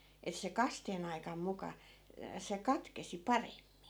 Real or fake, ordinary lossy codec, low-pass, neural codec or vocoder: real; none; none; none